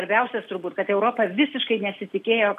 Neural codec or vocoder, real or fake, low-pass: none; real; 14.4 kHz